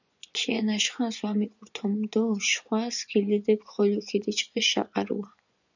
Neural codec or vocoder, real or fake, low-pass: vocoder, 24 kHz, 100 mel bands, Vocos; fake; 7.2 kHz